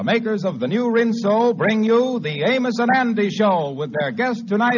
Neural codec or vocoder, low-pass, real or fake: none; 7.2 kHz; real